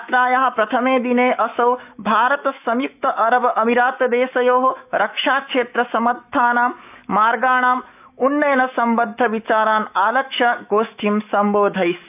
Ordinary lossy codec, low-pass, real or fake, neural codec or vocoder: none; 3.6 kHz; fake; autoencoder, 48 kHz, 128 numbers a frame, DAC-VAE, trained on Japanese speech